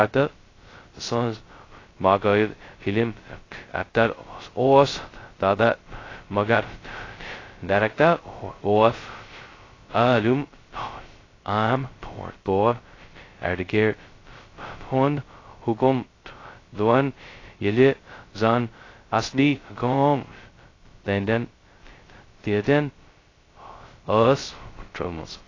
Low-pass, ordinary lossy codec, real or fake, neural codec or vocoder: 7.2 kHz; AAC, 32 kbps; fake; codec, 16 kHz, 0.2 kbps, FocalCodec